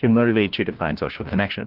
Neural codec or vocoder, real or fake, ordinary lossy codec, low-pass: codec, 16 kHz, 1 kbps, FunCodec, trained on LibriTTS, 50 frames a second; fake; Opus, 16 kbps; 5.4 kHz